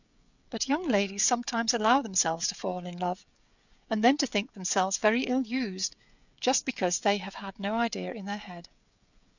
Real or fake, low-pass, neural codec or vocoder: fake; 7.2 kHz; codec, 16 kHz, 16 kbps, FreqCodec, smaller model